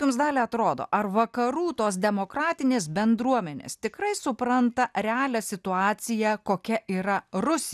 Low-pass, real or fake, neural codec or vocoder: 14.4 kHz; real; none